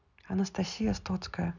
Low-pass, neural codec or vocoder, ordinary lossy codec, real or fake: 7.2 kHz; none; none; real